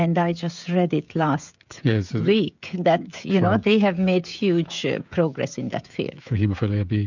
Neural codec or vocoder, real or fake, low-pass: codec, 16 kHz, 16 kbps, FreqCodec, smaller model; fake; 7.2 kHz